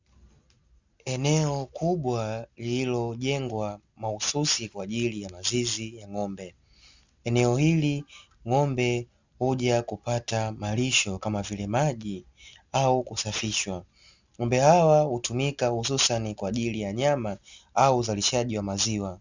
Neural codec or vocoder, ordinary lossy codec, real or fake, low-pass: none; Opus, 64 kbps; real; 7.2 kHz